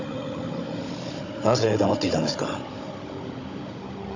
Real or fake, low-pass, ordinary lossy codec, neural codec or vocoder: fake; 7.2 kHz; AAC, 48 kbps; codec, 16 kHz, 16 kbps, FunCodec, trained on Chinese and English, 50 frames a second